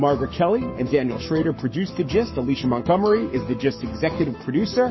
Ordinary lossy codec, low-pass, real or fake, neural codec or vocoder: MP3, 24 kbps; 7.2 kHz; fake; codec, 44.1 kHz, 7.8 kbps, Pupu-Codec